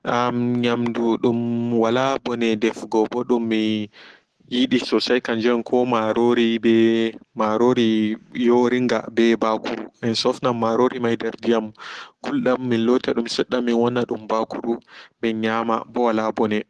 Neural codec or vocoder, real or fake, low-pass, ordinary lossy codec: none; real; 10.8 kHz; Opus, 16 kbps